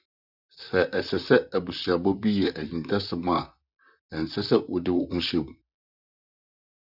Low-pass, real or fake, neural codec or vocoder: 5.4 kHz; fake; vocoder, 44.1 kHz, 128 mel bands, Pupu-Vocoder